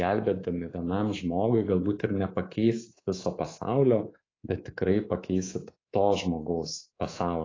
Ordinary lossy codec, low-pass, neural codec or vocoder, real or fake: AAC, 32 kbps; 7.2 kHz; codec, 24 kHz, 3.1 kbps, DualCodec; fake